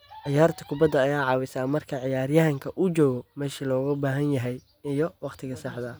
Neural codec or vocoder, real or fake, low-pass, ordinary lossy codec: none; real; none; none